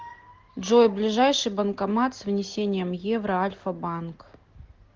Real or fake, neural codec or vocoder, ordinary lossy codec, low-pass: real; none; Opus, 16 kbps; 7.2 kHz